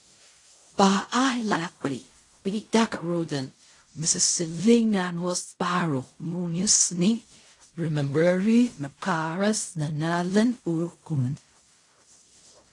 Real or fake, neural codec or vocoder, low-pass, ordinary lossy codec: fake; codec, 16 kHz in and 24 kHz out, 0.4 kbps, LongCat-Audio-Codec, fine tuned four codebook decoder; 10.8 kHz; AAC, 48 kbps